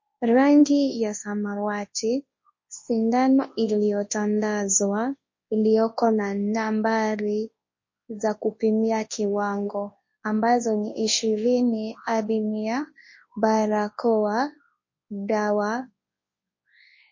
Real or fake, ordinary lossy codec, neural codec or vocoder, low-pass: fake; MP3, 32 kbps; codec, 24 kHz, 0.9 kbps, WavTokenizer, large speech release; 7.2 kHz